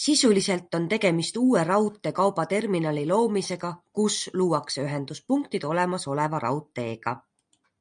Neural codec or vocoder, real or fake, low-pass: none; real; 9.9 kHz